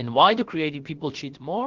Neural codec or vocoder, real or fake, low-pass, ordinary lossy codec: codec, 16 kHz, about 1 kbps, DyCAST, with the encoder's durations; fake; 7.2 kHz; Opus, 16 kbps